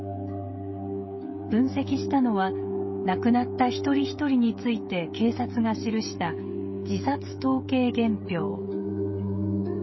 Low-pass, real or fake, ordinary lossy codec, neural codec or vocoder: 7.2 kHz; fake; MP3, 24 kbps; codec, 16 kHz, 16 kbps, FreqCodec, smaller model